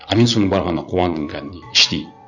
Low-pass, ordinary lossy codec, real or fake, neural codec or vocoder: 7.2 kHz; none; fake; vocoder, 44.1 kHz, 80 mel bands, Vocos